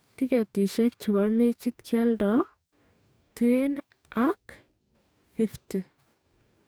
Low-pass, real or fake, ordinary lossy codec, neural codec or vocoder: none; fake; none; codec, 44.1 kHz, 2.6 kbps, DAC